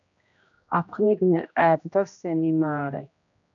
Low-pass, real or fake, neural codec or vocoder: 7.2 kHz; fake; codec, 16 kHz, 1 kbps, X-Codec, HuBERT features, trained on general audio